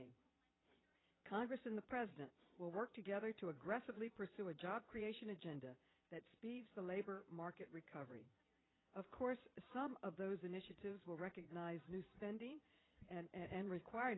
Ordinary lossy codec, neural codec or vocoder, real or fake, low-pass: AAC, 16 kbps; vocoder, 22.05 kHz, 80 mel bands, Vocos; fake; 7.2 kHz